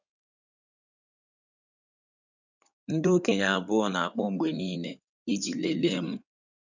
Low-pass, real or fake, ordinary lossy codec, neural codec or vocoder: 7.2 kHz; fake; none; codec, 16 kHz in and 24 kHz out, 2.2 kbps, FireRedTTS-2 codec